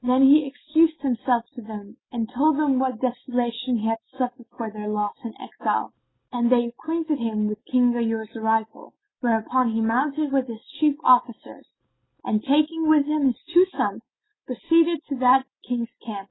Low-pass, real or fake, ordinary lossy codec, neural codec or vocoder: 7.2 kHz; real; AAC, 16 kbps; none